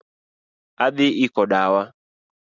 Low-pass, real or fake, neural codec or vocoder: 7.2 kHz; real; none